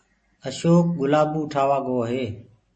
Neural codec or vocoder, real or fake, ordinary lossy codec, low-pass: none; real; MP3, 32 kbps; 9.9 kHz